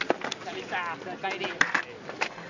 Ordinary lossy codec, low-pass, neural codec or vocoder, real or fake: none; 7.2 kHz; none; real